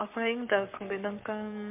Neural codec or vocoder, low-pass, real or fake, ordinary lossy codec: codec, 44.1 kHz, 7.8 kbps, Pupu-Codec; 3.6 kHz; fake; MP3, 32 kbps